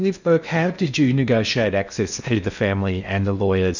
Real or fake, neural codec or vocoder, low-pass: fake; codec, 16 kHz in and 24 kHz out, 0.8 kbps, FocalCodec, streaming, 65536 codes; 7.2 kHz